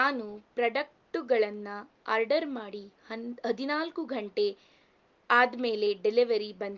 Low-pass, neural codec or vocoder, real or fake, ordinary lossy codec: 7.2 kHz; none; real; Opus, 32 kbps